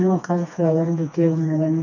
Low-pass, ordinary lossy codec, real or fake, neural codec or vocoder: 7.2 kHz; none; fake; codec, 16 kHz, 2 kbps, FreqCodec, smaller model